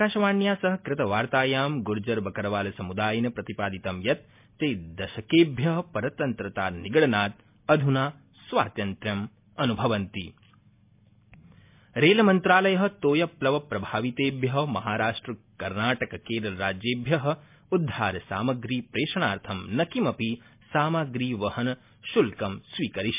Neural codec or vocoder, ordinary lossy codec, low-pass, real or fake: none; MP3, 32 kbps; 3.6 kHz; real